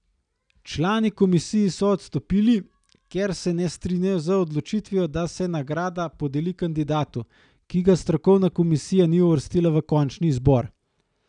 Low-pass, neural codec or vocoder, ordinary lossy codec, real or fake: 9.9 kHz; none; none; real